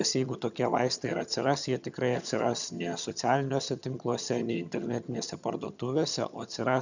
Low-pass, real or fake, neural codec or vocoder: 7.2 kHz; fake; vocoder, 22.05 kHz, 80 mel bands, HiFi-GAN